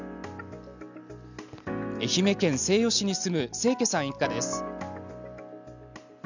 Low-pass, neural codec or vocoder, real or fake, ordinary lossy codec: 7.2 kHz; none; real; none